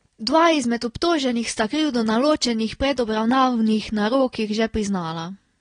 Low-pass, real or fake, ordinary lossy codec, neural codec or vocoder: 9.9 kHz; real; AAC, 32 kbps; none